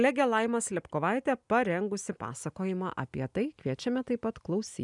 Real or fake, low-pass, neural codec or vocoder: real; 10.8 kHz; none